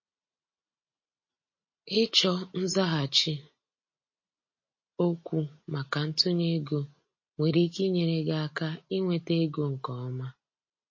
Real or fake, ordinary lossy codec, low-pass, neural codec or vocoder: real; MP3, 32 kbps; 7.2 kHz; none